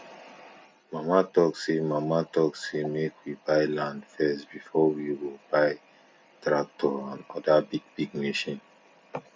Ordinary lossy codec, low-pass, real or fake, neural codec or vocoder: none; none; real; none